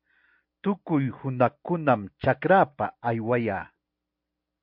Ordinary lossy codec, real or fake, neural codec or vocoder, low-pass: MP3, 48 kbps; real; none; 5.4 kHz